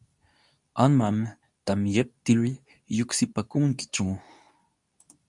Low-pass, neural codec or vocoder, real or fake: 10.8 kHz; codec, 24 kHz, 0.9 kbps, WavTokenizer, medium speech release version 2; fake